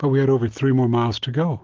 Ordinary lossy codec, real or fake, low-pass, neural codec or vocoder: Opus, 16 kbps; real; 7.2 kHz; none